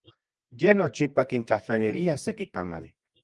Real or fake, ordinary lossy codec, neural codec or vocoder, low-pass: fake; Opus, 24 kbps; codec, 24 kHz, 0.9 kbps, WavTokenizer, medium music audio release; 10.8 kHz